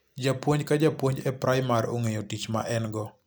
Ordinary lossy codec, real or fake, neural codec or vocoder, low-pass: none; real; none; none